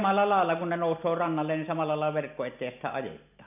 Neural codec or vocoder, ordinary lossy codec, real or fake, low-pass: none; none; real; 3.6 kHz